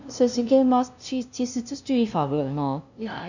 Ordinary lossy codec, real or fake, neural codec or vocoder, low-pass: none; fake; codec, 16 kHz, 0.5 kbps, FunCodec, trained on LibriTTS, 25 frames a second; 7.2 kHz